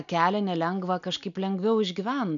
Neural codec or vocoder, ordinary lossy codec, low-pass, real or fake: none; MP3, 96 kbps; 7.2 kHz; real